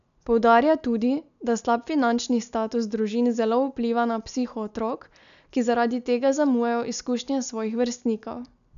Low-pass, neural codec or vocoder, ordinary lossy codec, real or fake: 7.2 kHz; none; none; real